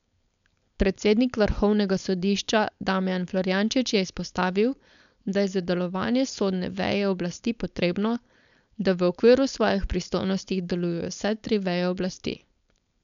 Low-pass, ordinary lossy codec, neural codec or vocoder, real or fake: 7.2 kHz; none; codec, 16 kHz, 4.8 kbps, FACodec; fake